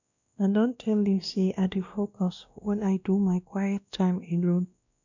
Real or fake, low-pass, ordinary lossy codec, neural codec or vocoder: fake; 7.2 kHz; none; codec, 16 kHz, 1 kbps, X-Codec, WavLM features, trained on Multilingual LibriSpeech